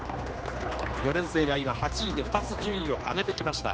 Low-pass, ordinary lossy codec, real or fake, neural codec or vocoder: none; none; fake; codec, 16 kHz, 2 kbps, X-Codec, HuBERT features, trained on general audio